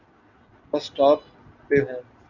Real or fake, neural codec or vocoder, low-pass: real; none; 7.2 kHz